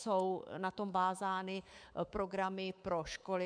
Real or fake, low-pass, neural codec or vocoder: fake; 9.9 kHz; codec, 24 kHz, 3.1 kbps, DualCodec